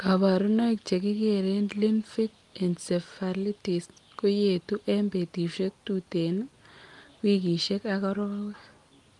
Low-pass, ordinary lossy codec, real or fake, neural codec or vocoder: 10.8 kHz; Opus, 24 kbps; real; none